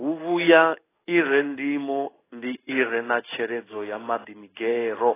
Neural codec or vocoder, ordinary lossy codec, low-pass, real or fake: codec, 16 kHz in and 24 kHz out, 1 kbps, XY-Tokenizer; AAC, 16 kbps; 3.6 kHz; fake